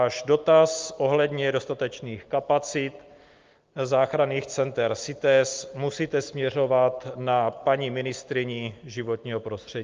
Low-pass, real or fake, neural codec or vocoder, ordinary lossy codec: 7.2 kHz; real; none; Opus, 32 kbps